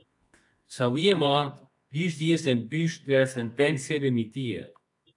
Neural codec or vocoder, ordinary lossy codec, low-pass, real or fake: codec, 24 kHz, 0.9 kbps, WavTokenizer, medium music audio release; AAC, 64 kbps; 10.8 kHz; fake